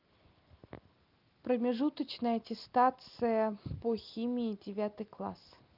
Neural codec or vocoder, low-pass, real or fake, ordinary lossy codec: none; 5.4 kHz; real; Opus, 24 kbps